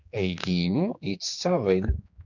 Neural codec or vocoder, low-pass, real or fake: codec, 16 kHz, 2 kbps, X-Codec, HuBERT features, trained on general audio; 7.2 kHz; fake